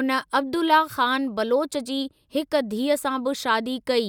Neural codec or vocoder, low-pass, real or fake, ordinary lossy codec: none; 19.8 kHz; real; none